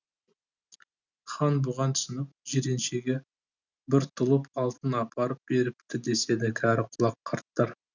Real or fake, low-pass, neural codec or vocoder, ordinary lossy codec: real; 7.2 kHz; none; none